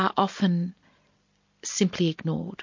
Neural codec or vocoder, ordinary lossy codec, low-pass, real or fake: none; MP3, 48 kbps; 7.2 kHz; real